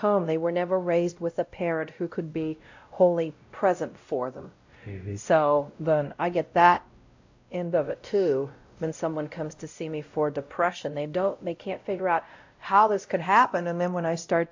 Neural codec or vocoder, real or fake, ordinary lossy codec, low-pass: codec, 16 kHz, 0.5 kbps, X-Codec, WavLM features, trained on Multilingual LibriSpeech; fake; AAC, 48 kbps; 7.2 kHz